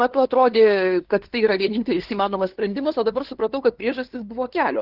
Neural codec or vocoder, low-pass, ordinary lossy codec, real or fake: codec, 16 kHz, 2 kbps, FunCodec, trained on LibriTTS, 25 frames a second; 5.4 kHz; Opus, 16 kbps; fake